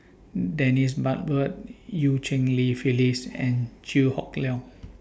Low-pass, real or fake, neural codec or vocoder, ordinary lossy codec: none; real; none; none